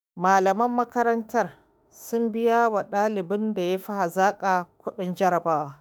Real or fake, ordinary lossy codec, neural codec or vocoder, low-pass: fake; none; autoencoder, 48 kHz, 32 numbers a frame, DAC-VAE, trained on Japanese speech; none